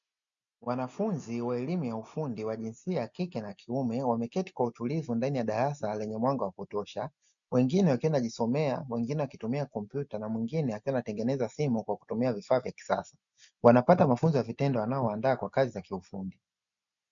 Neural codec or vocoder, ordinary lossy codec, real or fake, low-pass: none; MP3, 96 kbps; real; 7.2 kHz